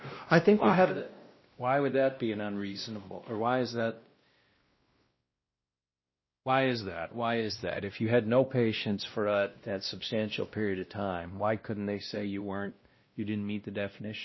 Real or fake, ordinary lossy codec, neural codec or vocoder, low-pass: fake; MP3, 24 kbps; codec, 16 kHz, 1 kbps, X-Codec, WavLM features, trained on Multilingual LibriSpeech; 7.2 kHz